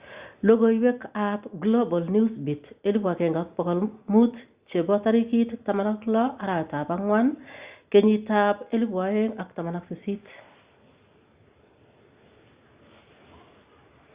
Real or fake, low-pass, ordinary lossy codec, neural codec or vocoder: real; 3.6 kHz; Opus, 64 kbps; none